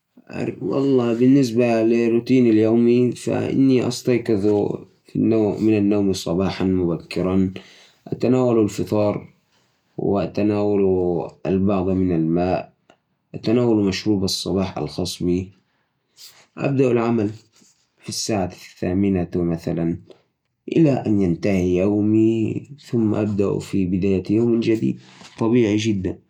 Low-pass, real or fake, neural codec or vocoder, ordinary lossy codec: 19.8 kHz; real; none; none